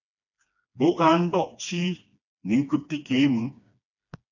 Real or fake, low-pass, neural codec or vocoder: fake; 7.2 kHz; codec, 16 kHz, 2 kbps, FreqCodec, smaller model